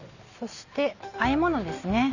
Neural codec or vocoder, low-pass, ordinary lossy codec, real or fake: none; 7.2 kHz; none; real